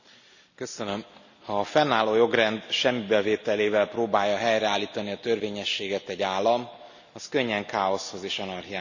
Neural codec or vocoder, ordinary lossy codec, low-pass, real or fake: none; none; 7.2 kHz; real